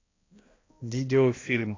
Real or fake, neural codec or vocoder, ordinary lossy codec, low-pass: fake; codec, 16 kHz, 1 kbps, X-Codec, HuBERT features, trained on balanced general audio; AAC, 32 kbps; 7.2 kHz